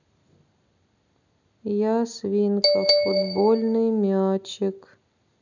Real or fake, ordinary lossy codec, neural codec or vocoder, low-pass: real; none; none; 7.2 kHz